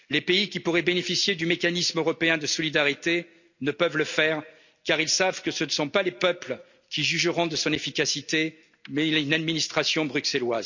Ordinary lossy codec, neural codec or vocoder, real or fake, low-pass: none; none; real; 7.2 kHz